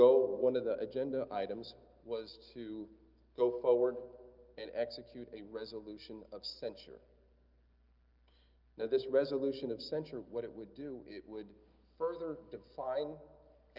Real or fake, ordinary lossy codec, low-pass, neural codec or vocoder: real; Opus, 32 kbps; 5.4 kHz; none